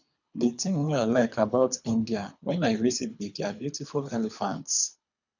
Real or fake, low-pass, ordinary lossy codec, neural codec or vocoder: fake; 7.2 kHz; none; codec, 24 kHz, 3 kbps, HILCodec